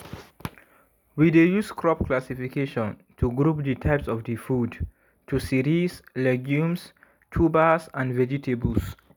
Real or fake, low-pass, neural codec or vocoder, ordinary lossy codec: real; none; none; none